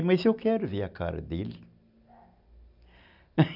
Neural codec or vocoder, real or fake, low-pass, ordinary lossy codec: none; real; 5.4 kHz; none